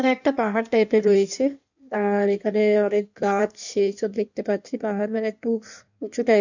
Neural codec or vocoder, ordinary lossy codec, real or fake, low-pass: codec, 16 kHz in and 24 kHz out, 1.1 kbps, FireRedTTS-2 codec; none; fake; 7.2 kHz